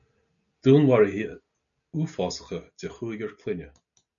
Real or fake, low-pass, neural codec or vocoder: real; 7.2 kHz; none